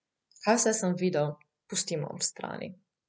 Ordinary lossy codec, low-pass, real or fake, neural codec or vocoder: none; none; real; none